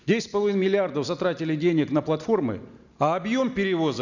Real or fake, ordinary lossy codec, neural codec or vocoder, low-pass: real; none; none; 7.2 kHz